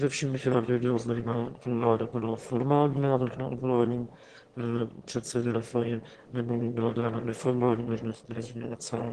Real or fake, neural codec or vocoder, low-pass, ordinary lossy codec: fake; autoencoder, 22.05 kHz, a latent of 192 numbers a frame, VITS, trained on one speaker; 9.9 kHz; Opus, 16 kbps